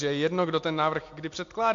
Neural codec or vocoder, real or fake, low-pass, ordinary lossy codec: none; real; 7.2 kHz; MP3, 48 kbps